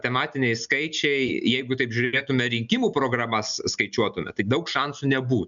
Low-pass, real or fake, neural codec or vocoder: 7.2 kHz; real; none